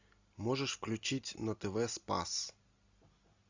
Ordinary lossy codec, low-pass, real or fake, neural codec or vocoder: Opus, 64 kbps; 7.2 kHz; real; none